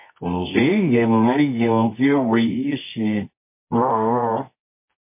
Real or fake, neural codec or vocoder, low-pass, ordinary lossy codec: fake; codec, 24 kHz, 0.9 kbps, WavTokenizer, medium music audio release; 3.6 kHz; MP3, 24 kbps